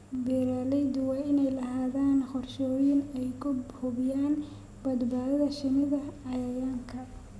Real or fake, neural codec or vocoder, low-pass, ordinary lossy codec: real; none; none; none